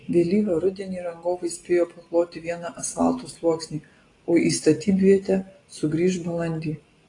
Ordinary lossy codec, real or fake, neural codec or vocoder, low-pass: AAC, 32 kbps; real; none; 10.8 kHz